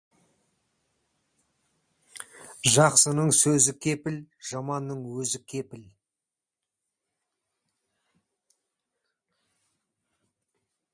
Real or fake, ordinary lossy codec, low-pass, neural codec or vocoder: real; Opus, 64 kbps; 9.9 kHz; none